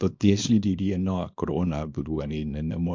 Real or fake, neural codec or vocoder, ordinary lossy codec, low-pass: fake; codec, 24 kHz, 0.9 kbps, WavTokenizer, small release; MP3, 48 kbps; 7.2 kHz